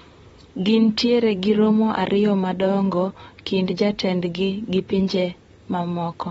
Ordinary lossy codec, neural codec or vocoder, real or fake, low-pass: AAC, 24 kbps; vocoder, 44.1 kHz, 128 mel bands, Pupu-Vocoder; fake; 19.8 kHz